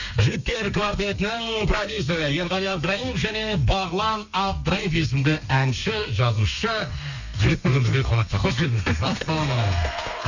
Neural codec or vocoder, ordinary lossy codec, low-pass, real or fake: codec, 32 kHz, 1.9 kbps, SNAC; none; 7.2 kHz; fake